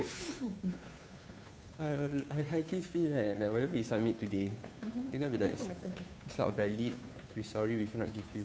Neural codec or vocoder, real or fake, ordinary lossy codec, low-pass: codec, 16 kHz, 2 kbps, FunCodec, trained on Chinese and English, 25 frames a second; fake; none; none